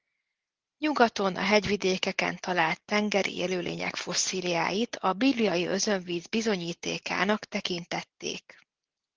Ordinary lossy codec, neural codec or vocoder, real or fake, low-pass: Opus, 16 kbps; none; real; 7.2 kHz